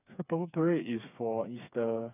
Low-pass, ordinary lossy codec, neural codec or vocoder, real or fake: 3.6 kHz; none; codec, 16 kHz, 4 kbps, FreqCodec, smaller model; fake